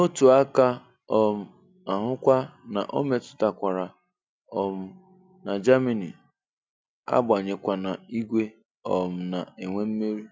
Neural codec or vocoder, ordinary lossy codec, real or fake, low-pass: none; none; real; none